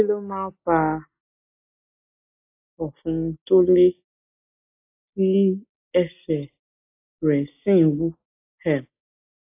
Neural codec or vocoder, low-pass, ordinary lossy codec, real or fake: none; 3.6 kHz; AAC, 32 kbps; real